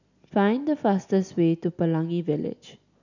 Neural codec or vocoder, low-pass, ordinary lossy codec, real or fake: none; 7.2 kHz; none; real